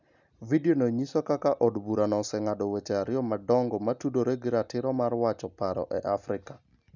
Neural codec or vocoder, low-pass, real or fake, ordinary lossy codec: none; 7.2 kHz; real; none